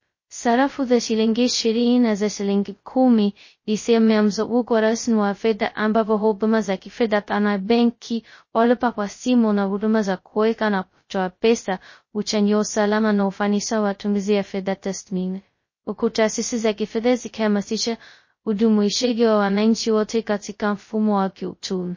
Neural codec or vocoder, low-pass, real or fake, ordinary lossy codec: codec, 16 kHz, 0.2 kbps, FocalCodec; 7.2 kHz; fake; MP3, 32 kbps